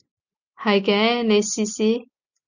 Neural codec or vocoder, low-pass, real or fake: none; 7.2 kHz; real